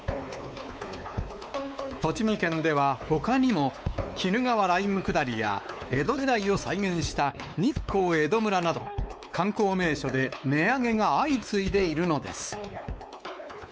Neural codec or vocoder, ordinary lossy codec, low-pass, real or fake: codec, 16 kHz, 4 kbps, X-Codec, WavLM features, trained on Multilingual LibriSpeech; none; none; fake